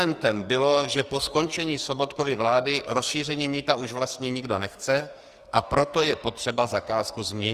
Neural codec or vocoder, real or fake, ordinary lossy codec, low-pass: codec, 44.1 kHz, 2.6 kbps, SNAC; fake; Opus, 32 kbps; 14.4 kHz